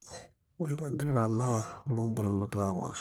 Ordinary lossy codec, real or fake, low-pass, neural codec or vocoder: none; fake; none; codec, 44.1 kHz, 1.7 kbps, Pupu-Codec